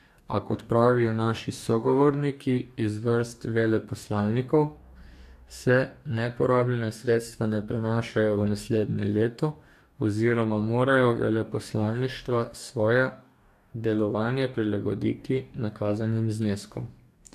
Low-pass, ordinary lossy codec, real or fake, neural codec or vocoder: 14.4 kHz; AAC, 96 kbps; fake; codec, 44.1 kHz, 2.6 kbps, DAC